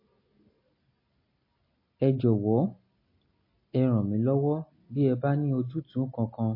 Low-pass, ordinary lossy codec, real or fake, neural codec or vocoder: 5.4 kHz; MP3, 24 kbps; real; none